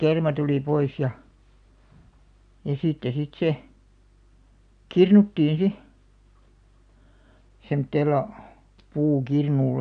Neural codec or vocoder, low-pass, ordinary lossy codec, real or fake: none; 9.9 kHz; none; real